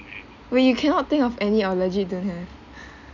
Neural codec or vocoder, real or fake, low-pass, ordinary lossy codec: none; real; 7.2 kHz; none